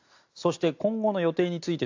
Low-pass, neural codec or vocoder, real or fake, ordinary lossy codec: 7.2 kHz; none; real; AAC, 48 kbps